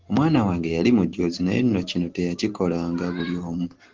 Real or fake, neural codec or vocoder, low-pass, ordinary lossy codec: real; none; 7.2 kHz; Opus, 16 kbps